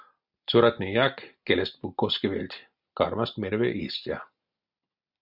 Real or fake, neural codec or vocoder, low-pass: real; none; 5.4 kHz